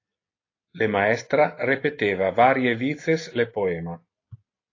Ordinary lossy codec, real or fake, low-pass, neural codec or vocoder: AAC, 32 kbps; real; 7.2 kHz; none